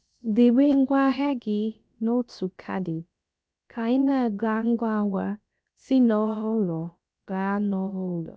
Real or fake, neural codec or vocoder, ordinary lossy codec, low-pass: fake; codec, 16 kHz, about 1 kbps, DyCAST, with the encoder's durations; none; none